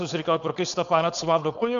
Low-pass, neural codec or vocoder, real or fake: 7.2 kHz; codec, 16 kHz, 4.8 kbps, FACodec; fake